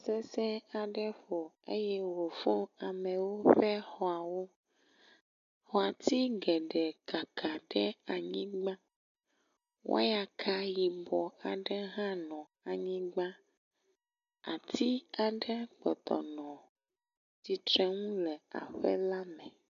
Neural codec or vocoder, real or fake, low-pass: none; real; 7.2 kHz